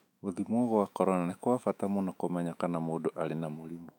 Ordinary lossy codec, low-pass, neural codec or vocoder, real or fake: none; 19.8 kHz; autoencoder, 48 kHz, 128 numbers a frame, DAC-VAE, trained on Japanese speech; fake